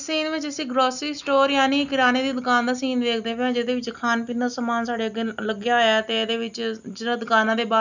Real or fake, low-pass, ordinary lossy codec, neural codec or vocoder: real; 7.2 kHz; none; none